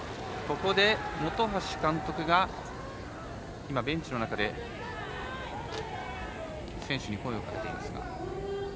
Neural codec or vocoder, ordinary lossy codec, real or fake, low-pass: none; none; real; none